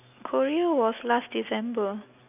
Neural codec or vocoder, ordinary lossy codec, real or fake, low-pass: none; none; real; 3.6 kHz